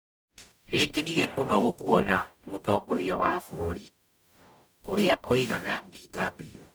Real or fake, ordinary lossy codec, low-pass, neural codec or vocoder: fake; none; none; codec, 44.1 kHz, 0.9 kbps, DAC